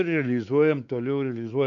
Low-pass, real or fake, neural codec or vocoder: 7.2 kHz; fake; codec, 16 kHz, 4 kbps, X-Codec, WavLM features, trained on Multilingual LibriSpeech